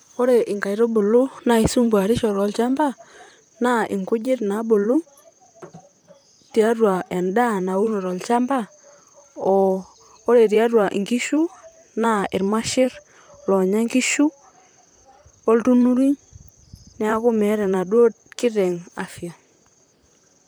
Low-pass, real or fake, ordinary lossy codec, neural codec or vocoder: none; fake; none; vocoder, 44.1 kHz, 128 mel bands, Pupu-Vocoder